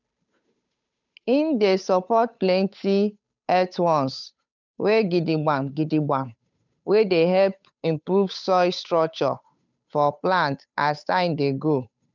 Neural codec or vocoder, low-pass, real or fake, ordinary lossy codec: codec, 16 kHz, 8 kbps, FunCodec, trained on Chinese and English, 25 frames a second; 7.2 kHz; fake; none